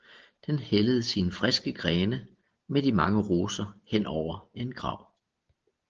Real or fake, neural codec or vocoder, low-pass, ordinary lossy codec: real; none; 7.2 kHz; Opus, 16 kbps